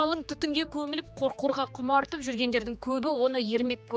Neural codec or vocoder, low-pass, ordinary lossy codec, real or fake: codec, 16 kHz, 2 kbps, X-Codec, HuBERT features, trained on general audio; none; none; fake